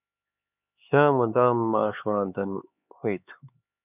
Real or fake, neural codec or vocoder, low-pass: fake; codec, 16 kHz, 4 kbps, X-Codec, HuBERT features, trained on LibriSpeech; 3.6 kHz